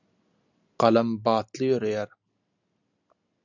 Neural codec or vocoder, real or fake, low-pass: none; real; 7.2 kHz